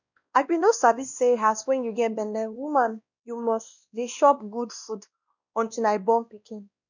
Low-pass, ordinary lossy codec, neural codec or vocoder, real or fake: 7.2 kHz; none; codec, 16 kHz, 1 kbps, X-Codec, WavLM features, trained on Multilingual LibriSpeech; fake